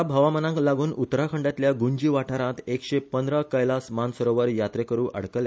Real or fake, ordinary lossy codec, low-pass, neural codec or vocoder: real; none; none; none